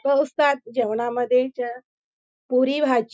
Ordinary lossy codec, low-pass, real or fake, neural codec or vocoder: none; none; real; none